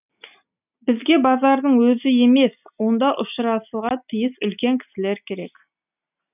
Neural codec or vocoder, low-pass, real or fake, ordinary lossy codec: none; 3.6 kHz; real; none